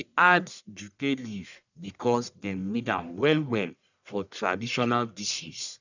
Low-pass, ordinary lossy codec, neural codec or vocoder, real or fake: 7.2 kHz; none; codec, 44.1 kHz, 1.7 kbps, Pupu-Codec; fake